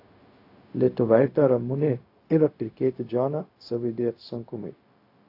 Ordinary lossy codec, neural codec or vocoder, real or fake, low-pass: AAC, 32 kbps; codec, 16 kHz, 0.4 kbps, LongCat-Audio-Codec; fake; 5.4 kHz